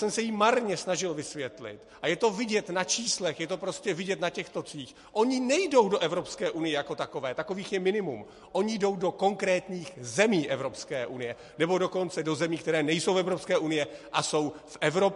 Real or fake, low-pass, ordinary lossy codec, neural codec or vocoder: real; 14.4 kHz; MP3, 48 kbps; none